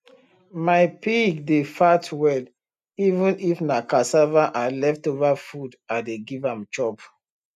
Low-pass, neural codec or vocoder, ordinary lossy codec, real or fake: 14.4 kHz; none; none; real